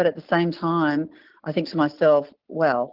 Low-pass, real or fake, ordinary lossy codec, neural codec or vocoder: 5.4 kHz; real; Opus, 16 kbps; none